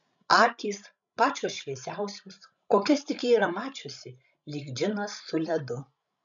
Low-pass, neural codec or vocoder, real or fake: 7.2 kHz; codec, 16 kHz, 16 kbps, FreqCodec, larger model; fake